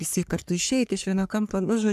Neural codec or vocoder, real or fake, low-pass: codec, 44.1 kHz, 3.4 kbps, Pupu-Codec; fake; 14.4 kHz